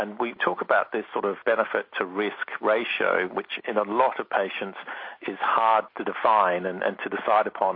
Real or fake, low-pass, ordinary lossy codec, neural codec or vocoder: real; 5.4 kHz; MP3, 32 kbps; none